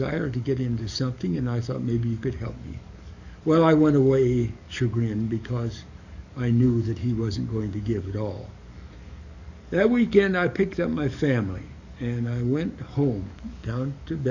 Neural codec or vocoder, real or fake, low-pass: codec, 44.1 kHz, 7.8 kbps, DAC; fake; 7.2 kHz